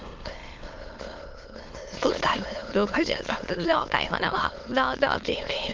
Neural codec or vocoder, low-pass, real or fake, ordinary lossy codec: autoencoder, 22.05 kHz, a latent of 192 numbers a frame, VITS, trained on many speakers; 7.2 kHz; fake; Opus, 24 kbps